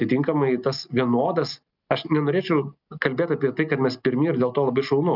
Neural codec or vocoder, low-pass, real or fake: none; 5.4 kHz; real